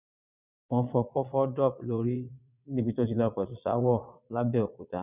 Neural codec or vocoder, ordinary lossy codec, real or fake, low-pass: vocoder, 22.05 kHz, 80 mel bands, Vocos; none; fake; 3.6 kHz